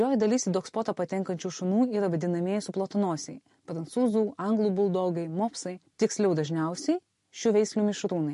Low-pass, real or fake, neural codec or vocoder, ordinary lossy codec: 14.4 kHz; real; none; MP3, 48 kbps